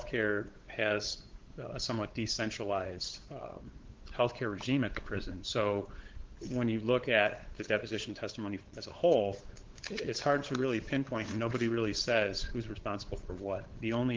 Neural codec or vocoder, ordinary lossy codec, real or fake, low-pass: codec, 16 kHz, 8 kbps, FunCodec, trained on LibriTTS, 25 frames a second; Opus, 16 kbps; fake; 7.2 kHz